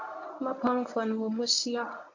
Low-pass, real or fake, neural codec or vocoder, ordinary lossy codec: 7.2 kHz; fake; codec, 24 kHz, 0.9 kbps, WavTokenizer, medium speech release version 1; AAC, 48 kbps